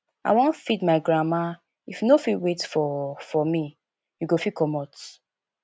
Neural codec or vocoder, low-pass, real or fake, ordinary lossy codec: none; none; real; none